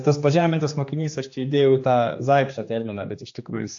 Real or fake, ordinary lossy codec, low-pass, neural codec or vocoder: fake; AAC, 48 kbps; 7.2 kHz; codec, 16 kHz, 2 kbps, X-Codec, HuBERT features, trained on general audio